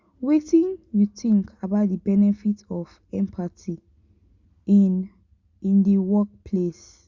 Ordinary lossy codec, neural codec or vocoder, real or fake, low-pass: Opus, 64 kbps; none; real; 7.2 kHz